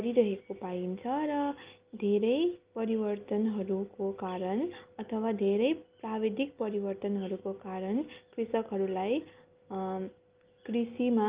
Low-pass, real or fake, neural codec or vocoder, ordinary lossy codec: 3.6 kHz; real; none; Opus, 32 kbps